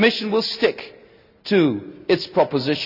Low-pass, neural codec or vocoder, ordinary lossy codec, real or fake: 5.4 kHz; none; none; real